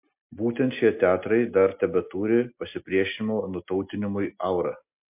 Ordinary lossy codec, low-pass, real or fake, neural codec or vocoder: MP3, 32 kbps; 3.6 kHz; real; none